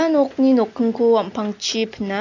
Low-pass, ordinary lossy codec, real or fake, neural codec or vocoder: 7.2 kHz; none; real; none